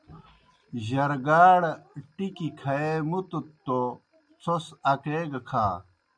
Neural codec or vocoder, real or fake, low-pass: none; real; 9.9 kHz